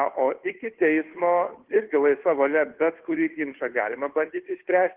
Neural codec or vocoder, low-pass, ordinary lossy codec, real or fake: codec, 16 kHz, 2 kbps, FunCodec, trained on Chinese and English, 25 frames a second; 3.6 kHz; Opus, 16 kbps; fake